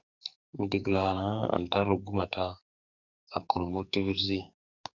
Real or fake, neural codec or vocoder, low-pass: fake; codec, 44.1 kHz, 2.6 kbps, SNAC; 7.2 kHz